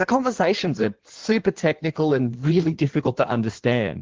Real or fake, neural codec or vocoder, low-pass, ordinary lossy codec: fake; codec, 16 kHz in and 24 kHz out, 1.1 kbps, FireRedTTS-2 codec; 7.2 kHz; Opus, 16 kbps